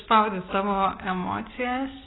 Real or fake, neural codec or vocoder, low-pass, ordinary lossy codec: real; none; 7.2 kHz; AAC, 16 kbps